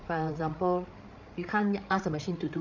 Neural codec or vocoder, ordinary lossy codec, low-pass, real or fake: codec, 16 kHz, 8 kbps, FreqCodec, larger model; none; 7.2 kHz; fake